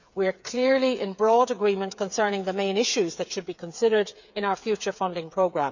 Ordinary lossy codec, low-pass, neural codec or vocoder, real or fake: none; 7.2 kHz; codec, 16 kHz, 8 kbps, FreqCodec, smaller model; fake